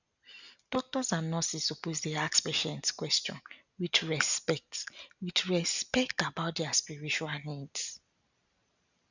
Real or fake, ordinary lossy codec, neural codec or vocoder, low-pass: real; none; none; 7.2 kHz